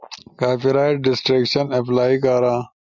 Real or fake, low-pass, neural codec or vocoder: real; 7.2 kHz; none